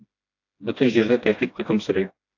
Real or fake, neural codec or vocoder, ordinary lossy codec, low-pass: fake; codec, 16 kHz, 1 kbps, FreqCodec, smaller model; AAC, 48 kbps; 7.2 kHz